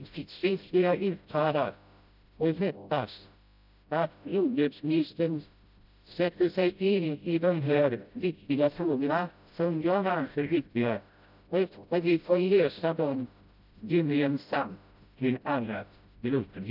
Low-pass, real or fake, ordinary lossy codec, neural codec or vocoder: 5.4 kHz; fake; none; codec, 16 kHz, 0.5 kbps, FreqCodec, smaller model